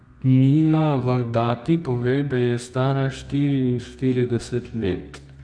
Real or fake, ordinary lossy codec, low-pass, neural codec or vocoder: fake; none; 9.9 kHz; codec, 24 kHz, 0.9 kbps, WavTokenizer, medium music audio release